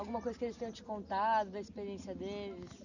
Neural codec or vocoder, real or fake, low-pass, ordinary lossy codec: none; real; 7.2 kHz; none